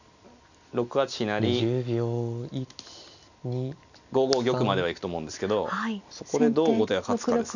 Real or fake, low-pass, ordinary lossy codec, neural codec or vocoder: real; 7.2 kHz; none; none